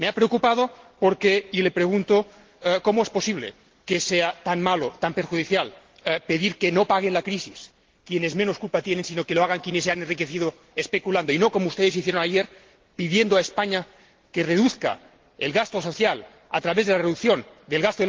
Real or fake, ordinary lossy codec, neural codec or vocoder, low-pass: real; Opus, 16 kbps; none; 7.2 kHz